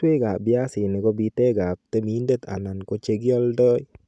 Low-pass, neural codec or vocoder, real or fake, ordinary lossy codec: none; none; real; none